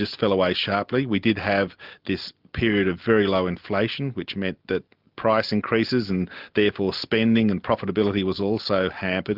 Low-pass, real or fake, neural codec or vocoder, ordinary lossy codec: 5.4 kHz; real; none; Opus, 24 kbps